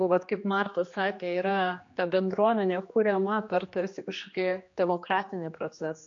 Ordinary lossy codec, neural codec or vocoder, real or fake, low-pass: AAC, 48 kbps; codec, 16 kHz, 2 kbps, X-Codec, HuBERT features, trained on general audio; fake; 7.2 kHz